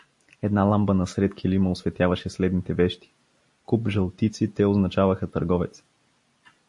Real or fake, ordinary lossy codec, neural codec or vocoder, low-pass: real; MP3, 48 kbps; none; 10.8 kHz